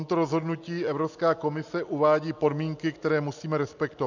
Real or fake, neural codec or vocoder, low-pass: real; none; 7.2 kHz